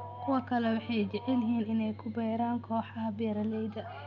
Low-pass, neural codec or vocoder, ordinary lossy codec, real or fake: 7.2 kHz; none; none; real